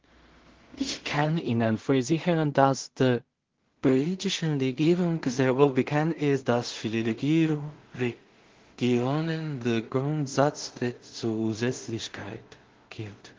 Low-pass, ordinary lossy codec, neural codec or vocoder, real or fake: 7.2 kHz; Opus, 16 kbps; codec, 16 kHz in and 24 kHz out, 0.4 kbps, LongCat-Audio-Codec, two codebook decoder; fake